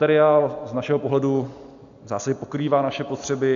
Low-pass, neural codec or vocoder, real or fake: 7.2 kHz; none; real